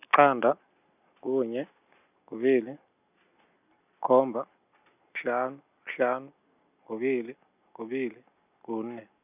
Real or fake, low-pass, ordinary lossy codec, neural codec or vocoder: real; 3.6 kHz; none; none